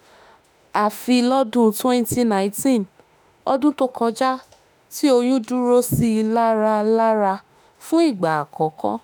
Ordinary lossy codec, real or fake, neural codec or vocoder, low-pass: none; fake; autoencoder, 48 kHz, 32 numbers a frame, DAC-VAE, trained on Japanese speech; none